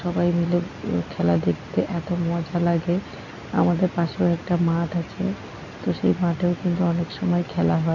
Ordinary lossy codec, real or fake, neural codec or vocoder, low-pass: none; real; none; 7.2 kHz